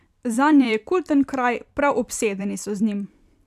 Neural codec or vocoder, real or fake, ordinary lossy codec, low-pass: vocoder, 44.1 kHz, 128 mel bands every 256 samples, BigVGAN v2; fake; none; 14.4 kHz